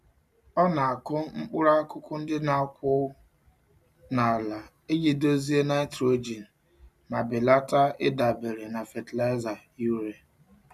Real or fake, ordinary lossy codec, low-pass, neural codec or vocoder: real; none; 14.4 kHz; none